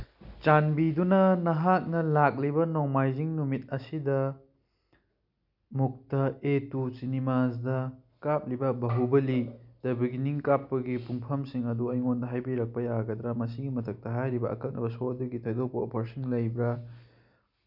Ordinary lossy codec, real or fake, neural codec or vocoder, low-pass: none; real; none; 5.4 kHz